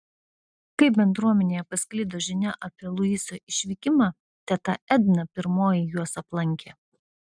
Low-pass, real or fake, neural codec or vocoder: 9.9 kHz; real; none